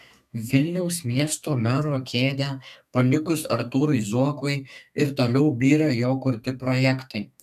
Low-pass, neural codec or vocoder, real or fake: 14.4 kHz; codec, 32 kHz, 1.9 kbps, SNAC; fake